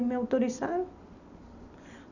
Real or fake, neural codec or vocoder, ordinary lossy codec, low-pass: real; none; none; 7.2 kHz